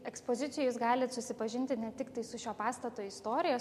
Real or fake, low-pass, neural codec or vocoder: real; 14.4 kHz; none